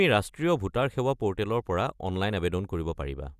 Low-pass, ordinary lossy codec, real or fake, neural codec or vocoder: 14.4 kHz; Opus, 64 kbps; real; none